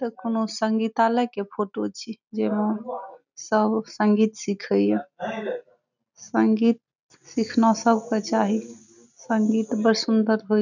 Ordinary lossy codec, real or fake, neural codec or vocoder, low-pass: none; real; none; 7.2 kHz